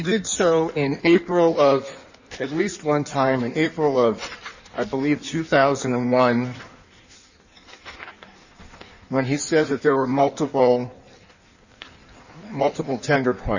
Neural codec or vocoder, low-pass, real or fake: codec, 16 kHz in and 24 kHz out, 1.1 kbps, FireRedTTS-2 codec; 7.2 kHz; fake